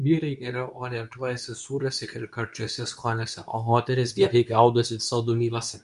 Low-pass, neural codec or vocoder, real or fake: 10.8 kHz; codec, 24 kHz, 0.9 kbps, WavTokenizer, medium speech release version 2; fake